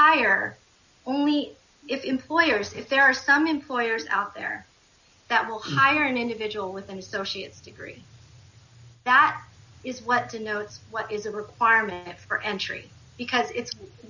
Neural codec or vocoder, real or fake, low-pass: none; real; 7.2 kHz